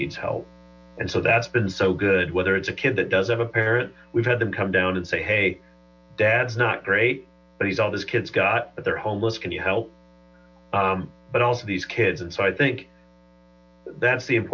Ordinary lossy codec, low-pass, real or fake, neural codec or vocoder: MP3, 64 kbps; 7.2 kHz; real; none